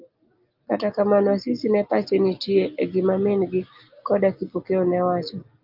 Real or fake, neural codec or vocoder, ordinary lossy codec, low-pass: real; none; Opus, 32 kbps; 5.4 kHz